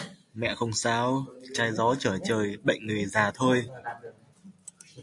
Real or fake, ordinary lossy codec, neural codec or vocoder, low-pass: real; AAC, 64 kbps; none; 10.8 kHz